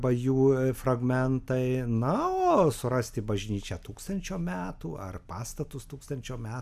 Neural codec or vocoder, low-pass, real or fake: none; 14.4 kHz; real